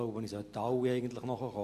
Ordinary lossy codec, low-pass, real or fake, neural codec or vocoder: MP3, 64 kbps; 14.4 kHz; real; none